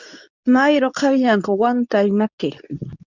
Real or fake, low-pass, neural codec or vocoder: fake; 7.2 kHz; codec, 24 kHz, 0.9 kbps, WavTokenizer, medium speech release version 2